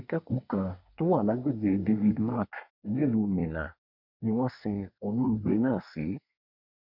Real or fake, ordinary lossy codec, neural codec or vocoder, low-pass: fake; none; codec, 24 kHz, 1 kbps, SNAC; 5.4 kHz